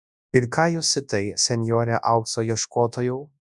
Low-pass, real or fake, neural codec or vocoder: 10.8 kHz; fake; codec, 24 kHz, 0.9 kbps, WavTokenizer, large speech release